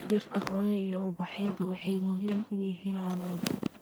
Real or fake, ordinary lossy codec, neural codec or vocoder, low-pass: fake; none; codec, 44.1 kHz, 1.7 kbps, Pupu-Codec; none